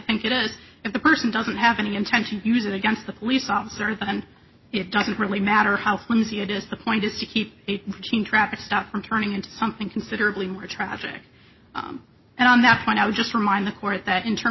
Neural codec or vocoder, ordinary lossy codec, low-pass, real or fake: none; MP3, 24 kbps; 7.2 kHz; real